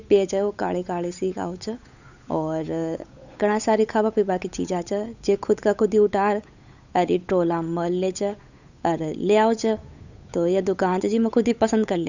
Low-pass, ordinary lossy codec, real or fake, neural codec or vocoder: 7.2 kHz; none; fake; codec, 16 kHz, 8 kbps, FunCodec, trained on Chinese and English, 25 frames a second